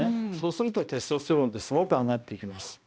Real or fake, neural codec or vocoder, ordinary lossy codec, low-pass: fake; codec, 16 kHz, 1 kbps, X-Codec, HuBERT features, trained on balanced general audio; none; none